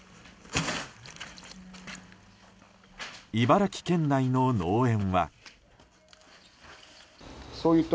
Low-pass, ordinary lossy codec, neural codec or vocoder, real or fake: none; none; none; real